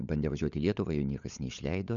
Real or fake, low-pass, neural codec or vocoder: fake; 7.2 kHz; codec, 16 kHz, 16 kbps, FunCodec, trained on LibriTTS, 50 frames a second